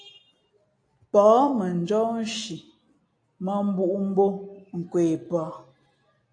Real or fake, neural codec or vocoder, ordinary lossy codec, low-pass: real; none; AAC, 48 kbps; 9.9 kHz